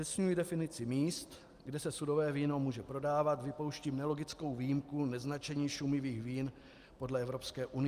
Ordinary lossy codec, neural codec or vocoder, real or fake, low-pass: Opus, 32 kbps; none; real; 14.4 kHz